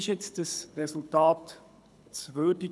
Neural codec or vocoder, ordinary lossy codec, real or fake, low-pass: codec, 24 kHz, 6 kbps, HILCodec; none; fake; none